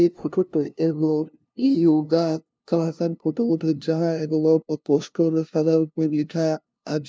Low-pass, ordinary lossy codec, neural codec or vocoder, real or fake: none; none; codec, 16 kHz, 0.5 kbps, FunCodec, trained on LibriTTS, 25 frames a second; fake